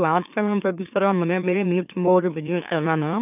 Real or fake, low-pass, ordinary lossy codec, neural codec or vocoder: fake; 3.6 kHz; none; autoencoder, 44.1 kHz, a latent of 192 numbers a frame, MeloTTS